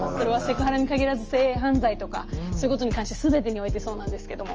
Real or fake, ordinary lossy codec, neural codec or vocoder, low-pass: real; Opus, 24 kbps; none; 7.2 kHz